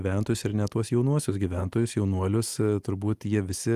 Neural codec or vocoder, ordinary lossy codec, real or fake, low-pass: none; Opus, 24 kbps; real; 14.4 kHz